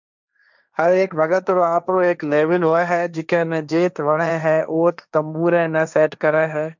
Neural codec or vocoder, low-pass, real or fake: codec, 16 kHz, 1.1 kbps, Voila-Tokenizer; 7.2 kHz; fake